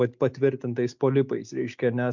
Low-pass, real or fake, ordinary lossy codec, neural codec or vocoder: 7.2 kHz; real; MP3, 64 kbps; none